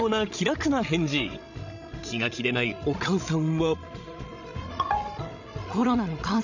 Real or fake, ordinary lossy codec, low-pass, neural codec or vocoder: fake; none; 7.2 kHz; codec, 16 kHz, 16 kbps, FreqCodec, larger model